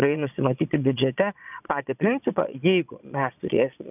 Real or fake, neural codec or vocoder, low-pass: fake; vocoder, 22.05 kHz, 80 mel bands, Vocos; 3.6 kHz